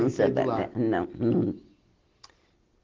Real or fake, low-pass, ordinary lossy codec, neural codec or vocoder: real; 7.2 kHz; Opus, 16 kbps; none